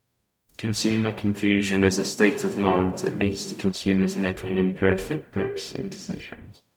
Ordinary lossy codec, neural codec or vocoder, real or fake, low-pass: none; codec, 44.1 kHz, 0.9 kbps, DAC; fake; 19.8 kHz